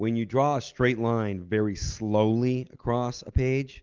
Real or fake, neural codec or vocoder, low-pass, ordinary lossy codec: real; none; 7.2 kHz; Opus, 32 kbps